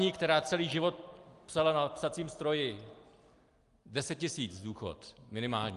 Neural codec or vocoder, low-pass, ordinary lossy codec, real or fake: none; 10.8 kHz; Opus, 32 kbps; real